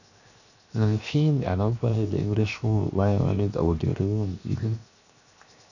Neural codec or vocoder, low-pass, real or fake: codec, 16 kHz, 0.7 kbps, FocalCodec; 7.2 kHz; fake